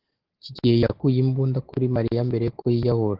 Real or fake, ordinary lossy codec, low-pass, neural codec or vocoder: real; Opus, 16 kbps; 5.4 kHz; none